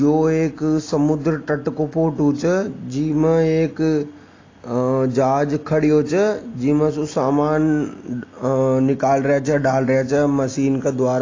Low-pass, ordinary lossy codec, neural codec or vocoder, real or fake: 7.2 kHz; AAC, 32 kbps; none; real